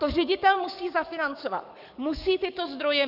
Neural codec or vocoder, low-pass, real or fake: codec, 44.1 kHz, 7.8 kbps, DAC; 5.4 kHz; fake